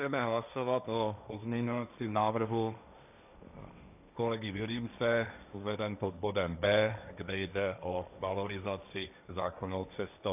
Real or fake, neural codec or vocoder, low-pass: fake; codec, 16 kHz, 1.1 kbps, Voila-Tokenizer; 3.6 kHz